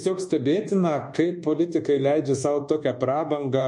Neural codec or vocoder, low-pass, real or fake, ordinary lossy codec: codec, 24 kHz, 1.2 kbps, DualCodec; 10.8 kHz; fake; MP3, 48 kbps